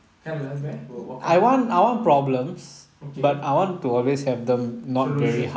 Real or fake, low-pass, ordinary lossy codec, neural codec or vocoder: real; none; none; none